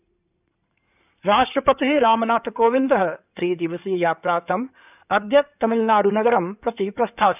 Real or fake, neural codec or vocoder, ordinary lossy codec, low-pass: fake; codec, 16 kHz in and 24 kHz out, 2.2 kbps, FireRedTTS-2 codec; none; 3.6 kHz